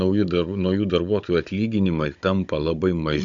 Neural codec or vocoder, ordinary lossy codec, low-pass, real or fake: codec, 16 kHz, 16 kbps, FunCodec, trained on Chinese and English, 50 frames a second; MP3, 64 kbps; 7.2 kHz; fake